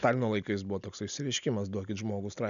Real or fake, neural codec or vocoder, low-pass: real; none; 7.2 kHz